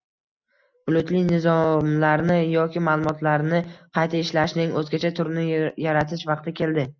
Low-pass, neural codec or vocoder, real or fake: 7.2 kHz; none; real